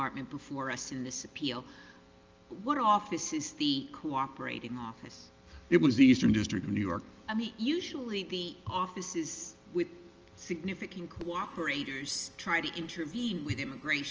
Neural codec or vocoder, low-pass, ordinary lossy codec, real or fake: none; 7.2 kHz; Opus, 24 kbps; real